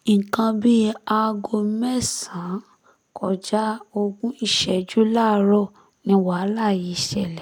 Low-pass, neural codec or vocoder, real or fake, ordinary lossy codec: 19.8 kHz; none; real; none